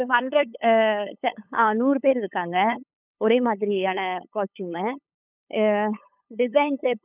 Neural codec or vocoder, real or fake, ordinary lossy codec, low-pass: codec, 16 kHz, 8 kbps, FunCodec, trained on LibriTTS, 25 frames a second; fake; none; 3.6 kHz